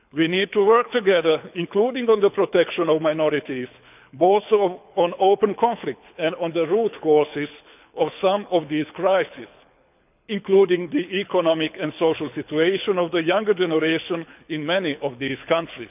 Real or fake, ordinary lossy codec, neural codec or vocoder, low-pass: fake; none; codec, 24 kHz, 6 kbps, HILCodec; 3.6 kHz